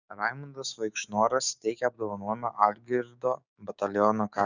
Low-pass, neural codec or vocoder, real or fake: 7.2 kHz; codec, 16 kHz, 6 kbps, DAC; fake